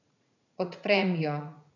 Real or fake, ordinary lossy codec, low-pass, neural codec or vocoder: fake; none; 7.2 kHz; vocoder, 44.1 kHz, 80 mel bands, Vocos